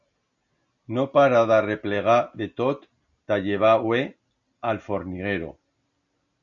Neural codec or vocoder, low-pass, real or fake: none; 7.2 kHz; real